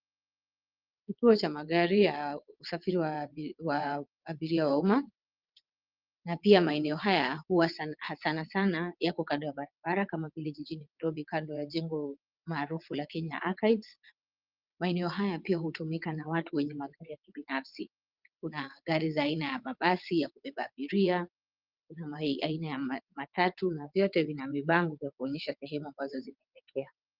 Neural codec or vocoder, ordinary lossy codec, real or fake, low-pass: vocoder, 22.05 kHz, 80 mel bands, WaveNeXt; Opus, 24 kbps; fake; 5.4 kHz